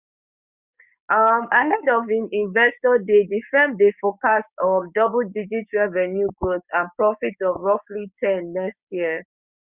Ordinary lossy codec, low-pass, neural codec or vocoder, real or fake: Opus, 64 kbps; 3.6 kHz; codec, 16 kHz, 6 kbps, DAC; fake